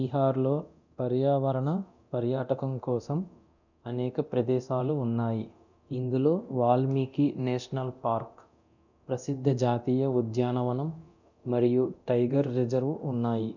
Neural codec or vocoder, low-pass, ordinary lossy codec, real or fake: codec, 24 kHz, 0.9 kbps, DualCodec; 7.2 kHz; none; fake